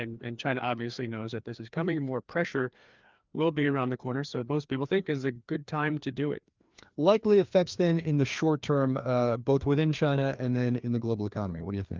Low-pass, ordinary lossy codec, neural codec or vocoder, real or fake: 7.2 kHz; Opus, 24 kbps; codec, 16 kHz, 2 kbps, FreqCodec, larger model; fake